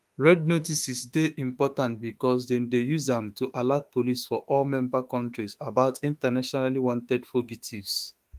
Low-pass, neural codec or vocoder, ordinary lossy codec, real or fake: 14.4 kHz; autoencoder, 48 kHz, 32 numbers a frame, DAC-VAE, trained on Japanese speech; Opus, 32 kbps; fake